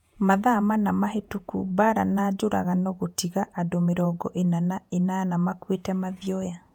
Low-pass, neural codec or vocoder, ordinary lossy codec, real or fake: 19.8 kHz; vocoder, 48 kHz, 128 mel bands, Vocos; none; fake